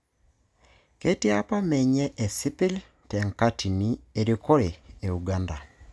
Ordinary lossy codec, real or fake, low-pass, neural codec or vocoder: none; real; none; none